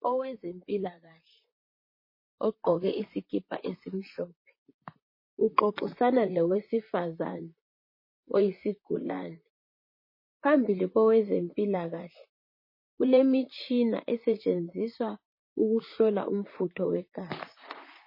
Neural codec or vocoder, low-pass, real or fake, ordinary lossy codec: vocoder, 44.1 kHz, 128 mel bands, Pupu-Vocoder; 5.4 kHz; fake; MP3, 24 kbps